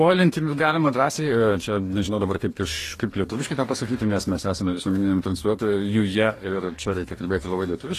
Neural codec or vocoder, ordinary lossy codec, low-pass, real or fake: codec, 44.1 kHz, 2.6 kbps, DAC; AAC, 48 kbps; 14.4 kHz; fake